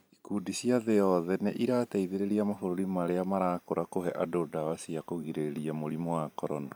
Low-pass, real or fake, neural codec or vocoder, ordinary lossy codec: none; fake; vocoder, 44.1 kHz, 128 mel bands every 512 samples, BigVGAN v2; none